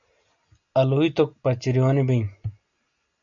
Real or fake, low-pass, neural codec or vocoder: real; 7.2 kHz; none